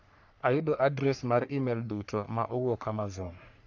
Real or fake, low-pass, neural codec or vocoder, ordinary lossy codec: fake; 7.2 kHz; codec, 44.1 kHz, 3.4 kbps, Pupu-Codec; none